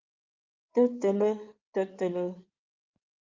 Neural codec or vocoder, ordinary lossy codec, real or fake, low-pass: codec, 16 kHz in and 24 kHz out, 2.2 kbps, FireRedTTS-2 codec; Opus, 24 kbps; fake; 7.2 kHz